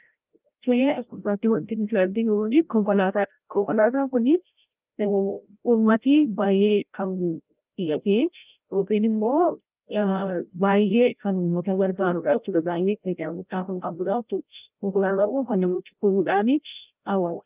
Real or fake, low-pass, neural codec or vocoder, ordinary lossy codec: fake; 3.6 kHz; codec, 16 kHz, 0.5 kbps, FreqCodec, larger model; Opus, 24 kbps